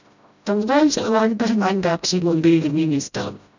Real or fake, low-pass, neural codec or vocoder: fake; 7.2 kHz; codec, 16 kHz, 0.5 kbps, FreqCodec, smaller model